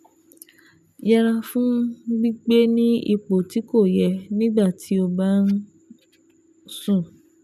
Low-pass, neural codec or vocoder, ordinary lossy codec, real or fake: 14.4 kHz; none; none; real